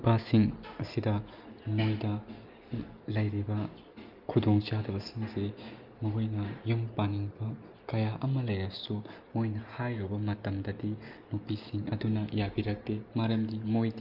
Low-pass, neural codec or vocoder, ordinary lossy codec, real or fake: 5.4 kHz; none; Opus, 24 kbps; real